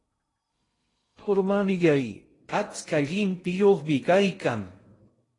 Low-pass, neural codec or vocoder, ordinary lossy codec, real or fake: 10.8 kHz; codec, 16 kHz in and 24 kHz out, 0.6 kbps, FocalCodec, streaming, 2048 codes; AAC, 32 kbps; fake